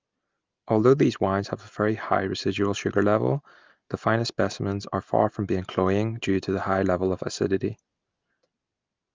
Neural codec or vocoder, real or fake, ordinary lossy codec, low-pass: none; real; Opus, 24 kbps; 7.2 kHz